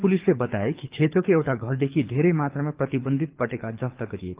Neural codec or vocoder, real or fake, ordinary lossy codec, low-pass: codec, 24 kHz, 6 kbps, HILCodec; fake; Opus, 32 kbps; 3.6 kHz